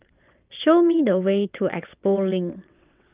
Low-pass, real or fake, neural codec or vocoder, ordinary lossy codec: 3.6 kHz; fake; vocoder, 22.05 kHz, 80 mel bands, WaveNeXt; Opus, 24 kbps